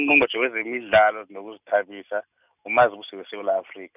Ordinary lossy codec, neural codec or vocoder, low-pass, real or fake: none; none; 3.6 kHz; real